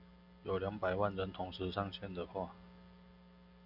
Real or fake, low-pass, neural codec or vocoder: real; 5.4 kHz; none